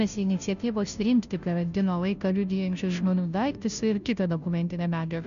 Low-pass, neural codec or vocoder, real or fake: 7.2 kHz; codec, 16 kHz, 0.5 kbps, FunCodec, trained on Chinese and English, 25 frames a second; fake